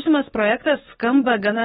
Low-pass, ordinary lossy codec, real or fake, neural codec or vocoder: 19.8 kHz; AAC, 16 kbps; fake; codec, 44.1 kHz, 7.8 kbps, DAC